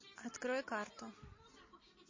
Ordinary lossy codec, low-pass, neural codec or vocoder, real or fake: MP3, 32 kbps; 7.2 kHz; none; real